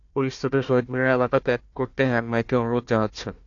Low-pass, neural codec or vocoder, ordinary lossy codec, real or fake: 7.2 kHz; codec, 16 kHz, 1 kbps, FunCodec, trained on Chinese and English, 50 frames a second; AAC, 48 kbps; fake